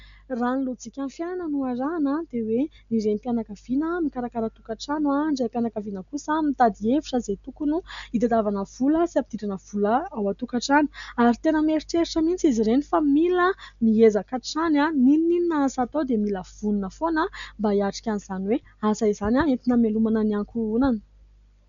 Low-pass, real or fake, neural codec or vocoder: 7.2 kHz; real; none